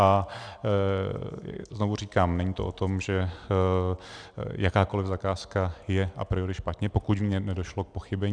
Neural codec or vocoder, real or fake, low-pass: none; real; 9.9 kHz